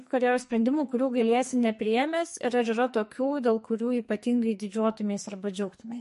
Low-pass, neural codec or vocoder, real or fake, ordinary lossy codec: 14.4 kHz; codec, 44.1 kHz, 2.6 kbps, SNAC; fake; MP3, 48 kbps